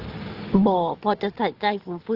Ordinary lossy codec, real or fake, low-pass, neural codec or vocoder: Opus, 16 kbps; real; 5.4 kHz; none